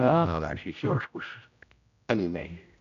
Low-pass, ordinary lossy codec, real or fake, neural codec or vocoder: 7.2 kHz; none; fake; codec, 16 kHz, 0.5 kbps, X-Codec, HuBERT features, trained on general audio